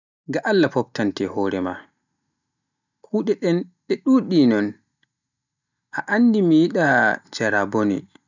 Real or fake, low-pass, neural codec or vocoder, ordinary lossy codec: real; 7.2 kHz; none; none